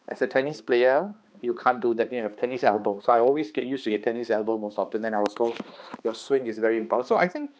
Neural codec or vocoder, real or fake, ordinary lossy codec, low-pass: codec, 16 kHz, 2 kbps, X-Codec, HuBERT features, trained on balanced general audio; fake; none; none